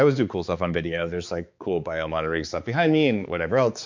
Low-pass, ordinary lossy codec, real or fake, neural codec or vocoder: 7.2 kHz; MP3, 48 kbps; fake; codec, 16 kHz, 4 kbps, X-Codec, HuBERT features, trained on balanced general audio